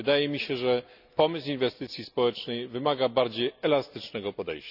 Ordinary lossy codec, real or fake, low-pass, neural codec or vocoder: none; real; 5.4 kHz; none